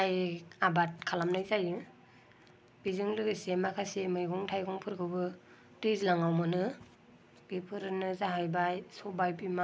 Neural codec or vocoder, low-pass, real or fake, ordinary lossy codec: none; none; real; none